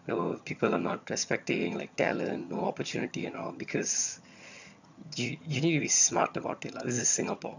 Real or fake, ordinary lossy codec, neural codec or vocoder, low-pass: fake; none; vocoder, 22.05 kHz, 80 mel bands, HiFi-GAN; 7.2 kHz